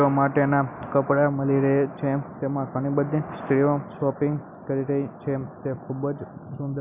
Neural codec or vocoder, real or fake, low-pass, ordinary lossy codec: none; real; 3.6 kHz; none